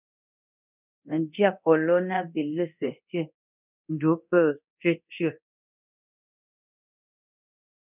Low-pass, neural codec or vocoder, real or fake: 3.6 kHz; codec, 24 kHz, 0.9 kbps, DualCodec; fake